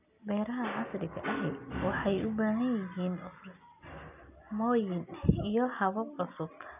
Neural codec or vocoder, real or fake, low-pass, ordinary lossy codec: none; real; 3.6 kHz; none